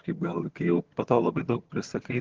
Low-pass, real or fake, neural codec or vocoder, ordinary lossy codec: 7.2 kHz; fake; vocoder, 22.05 kHz, 80 mel bands, HiFi-GAN; Opus, 16 kbps